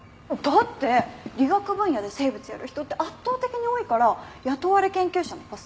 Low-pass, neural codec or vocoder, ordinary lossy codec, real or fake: none; none; none; real